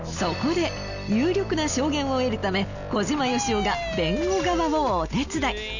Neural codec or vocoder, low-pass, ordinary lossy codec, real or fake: none; 7.2 kHz; none; real